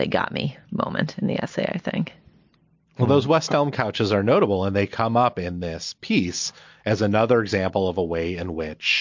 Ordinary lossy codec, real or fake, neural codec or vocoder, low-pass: MP3, 48 kbps; real; none; 7.2 kHz